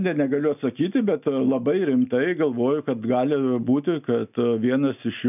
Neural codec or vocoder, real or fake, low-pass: none; real; 3.6 kHz